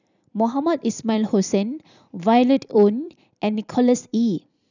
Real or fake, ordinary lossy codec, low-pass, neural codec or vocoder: fake; none; 7.2 kHz; vocoder, 44.1 kHz, 128 mel bands every 256 samples, BigVGAN v2